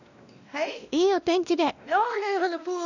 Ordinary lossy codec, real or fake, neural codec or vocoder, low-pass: none; fake; codec, 16 kHz, 1 kbps, X-Codec, WavLM features, trained on Multilingual LibriSpeech; 7.2 kHz